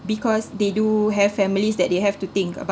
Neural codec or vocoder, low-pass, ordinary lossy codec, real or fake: none; none; none; real